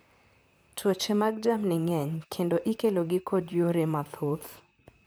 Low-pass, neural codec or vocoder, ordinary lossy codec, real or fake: none; vocoder, 44.1 kHz, 128 mel bands, Pupu-Vocoder; none; fake